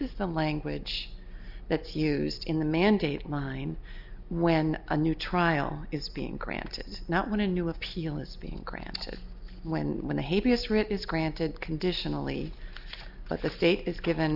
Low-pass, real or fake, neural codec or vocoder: 5.4 kHz; real; none